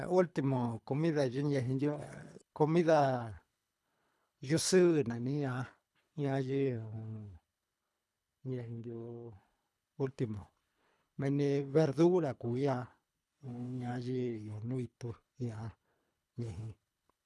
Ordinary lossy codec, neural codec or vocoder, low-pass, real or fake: none; codec, 24 kHz, 3 kbps, HILCodec; none; fake